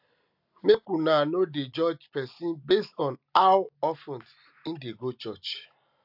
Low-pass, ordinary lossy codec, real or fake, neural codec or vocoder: 5.4 kHz; AAC, 48 kbps; real; none